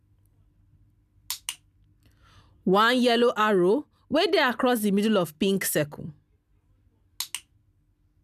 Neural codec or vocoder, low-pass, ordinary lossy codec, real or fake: none; 14.4 kHz; none; real